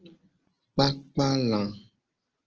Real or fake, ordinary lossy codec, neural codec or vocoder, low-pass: real; Opus, 16 kbps; none; 7.2 kHz